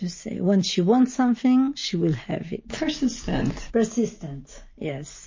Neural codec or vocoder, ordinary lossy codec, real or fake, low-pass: none; MP3, 32 kbps; real; 7.2 kHz